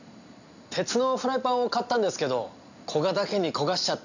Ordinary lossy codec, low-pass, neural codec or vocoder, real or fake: none; 7.2 kHz; none; real